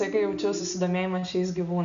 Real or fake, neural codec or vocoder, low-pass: real; none; 7.2 kHz